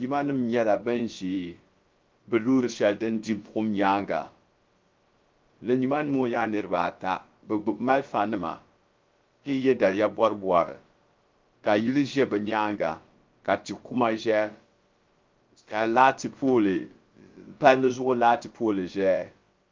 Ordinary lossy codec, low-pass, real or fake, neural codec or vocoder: Opus, 32 kbps; 7.2 kHz; fake; codec, 16 kHz, about 1 kbps, DyCAST, with the encoder's durations